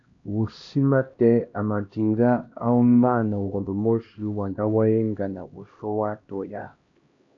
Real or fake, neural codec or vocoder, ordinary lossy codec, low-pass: fake; codec, 16 kHz, 1 kbps, X-Codec, HuBERT features, trained on LibriSpeech; AAC, 48 kbps; 7.2 kHz